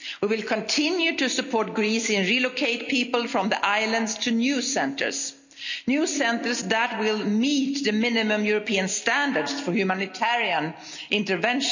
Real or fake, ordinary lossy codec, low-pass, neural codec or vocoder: real; none; 7.2 kHz; none